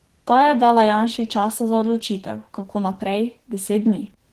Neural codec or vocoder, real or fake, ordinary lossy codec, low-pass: codec, 44.1 kHz, 2.6 kbps, SNAC; fake; Opus, 16 kbps; 14.4 kHz